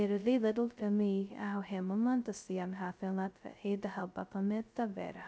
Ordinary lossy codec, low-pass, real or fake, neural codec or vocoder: none; none; fake; codec, 16 kHz, 0.2 kbps, FocalCodec